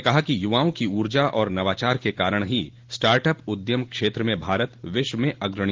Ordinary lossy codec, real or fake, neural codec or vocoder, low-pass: Opus, 16 kbps; real; none; 7.2 kHz